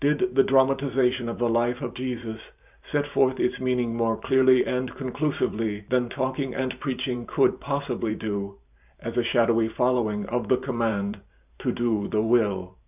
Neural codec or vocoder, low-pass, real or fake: vocoder, 44.1 kHz, 128 mel bands every 512 samples, BigVGAN v2; 3.6 kHz; fake